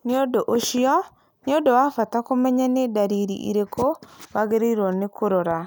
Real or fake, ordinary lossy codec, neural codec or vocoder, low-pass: real; none; none; none